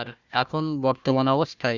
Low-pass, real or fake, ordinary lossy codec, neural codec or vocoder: 7.2 kHz; fake; Opus, 64 kbps; codec, 16 kHz, 1 kbps, FunCodec, trained on Chinese and English, 50 frames a second